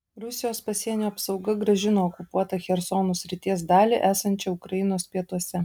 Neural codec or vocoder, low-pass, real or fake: none; 19.8 kHz; real